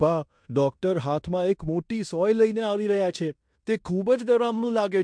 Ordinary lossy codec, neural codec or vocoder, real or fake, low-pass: MP3, 64 kbps; codec, 16 kHz in and 24 kHz out, 0.9 kbps, LongCat-Audio-Codec, fine tuned four codebook decoder; fake; 9.9 kHz